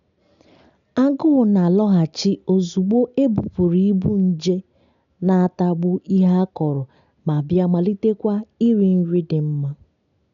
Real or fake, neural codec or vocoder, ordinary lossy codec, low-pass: real; none; none; 7.2 kHz